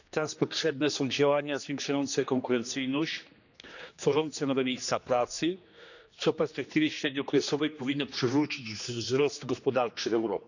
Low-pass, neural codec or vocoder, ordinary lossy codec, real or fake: 7.2 kHz; codec, 16 kHz, 2 kbps, X-Codec, HuBERT features, trained on general audio; none; fake